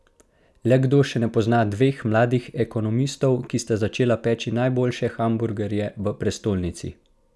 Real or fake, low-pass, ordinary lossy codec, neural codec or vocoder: real; none; none; none